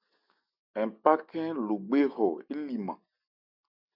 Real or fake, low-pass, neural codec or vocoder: real; 5.4 kHz; none